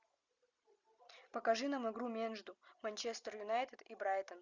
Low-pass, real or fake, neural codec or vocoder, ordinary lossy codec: 7.2 kHz; real; none; Opus, 64 kbps